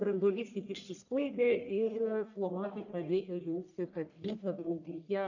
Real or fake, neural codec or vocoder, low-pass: fake; codec, 44.1 kHz, 1.7 kbps, Pupu-Codec; 7.2 kHz